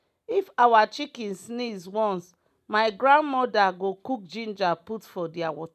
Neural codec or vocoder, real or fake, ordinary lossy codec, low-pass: none; real; none; 14.4 kHz